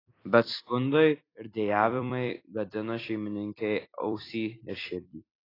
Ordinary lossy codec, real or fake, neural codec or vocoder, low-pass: AAC, 24 kbps; fake; vocoder, 44.1 kHz, 128 mel bands every 256 samples, BigVGAN v2; 5.4 kHz